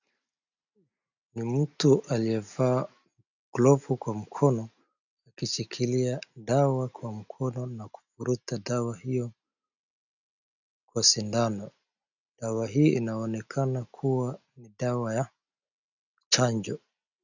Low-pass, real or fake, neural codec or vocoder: 7.2 kHz; real; none